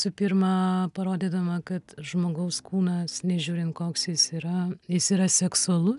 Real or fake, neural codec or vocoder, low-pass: real; none; 10.8 kHz